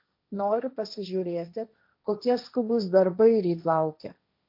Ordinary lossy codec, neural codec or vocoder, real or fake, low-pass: MP3, 48 kbps; codec, 16 kHz, 1.1 kbps, Voila-Tokenizer; fake; 5.4 kHz